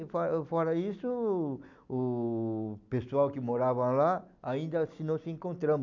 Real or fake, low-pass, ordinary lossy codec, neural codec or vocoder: real; 7.2 kHz; none; none